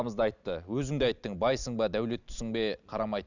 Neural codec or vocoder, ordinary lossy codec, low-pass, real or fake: none; none; 7.2 kHz; real